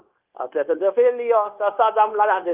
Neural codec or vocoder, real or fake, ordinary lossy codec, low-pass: codec, 16 kHz, 0.9 kbps, LongCat-Audio-Codec; fake; Opus, 32 kbps; 3.6 kHz